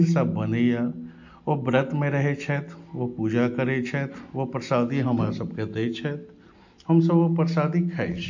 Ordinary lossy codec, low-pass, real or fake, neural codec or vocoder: MP3, 48 kbps; 7.2 kHz; real; none